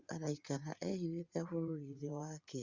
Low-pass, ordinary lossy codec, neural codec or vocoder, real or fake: 7.2 kHz; Opus, 64 kbps; vocoder, 44.1 kHz, 80 mel bands, Vocos; fake